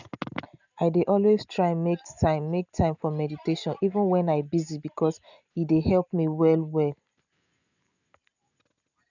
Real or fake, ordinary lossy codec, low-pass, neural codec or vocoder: real; none; 7.2 kHz; none